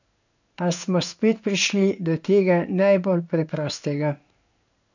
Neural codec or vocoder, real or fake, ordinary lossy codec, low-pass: codec, 16 kHz in and 24 kHz out, 1 kbps, XY-Tokenizer; fake; none; 7.2 kHz